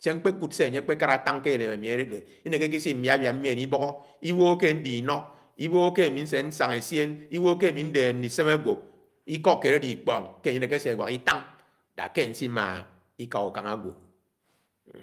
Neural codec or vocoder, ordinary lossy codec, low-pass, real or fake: none; Opus, 32 kbps; 14.4 kHz; real